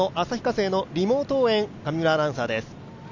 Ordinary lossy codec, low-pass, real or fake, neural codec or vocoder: none; 7.2 kHz; real; none